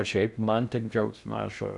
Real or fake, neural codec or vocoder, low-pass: fake; codec, 16 kHz in and 24 kHz out, 0.6 kbps, FocalCodec, streaming, 2048 codes; 10.8 kHz